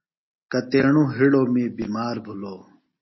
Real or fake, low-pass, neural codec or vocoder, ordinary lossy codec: real; 7.2 kHz; none; MP3, 24 kbps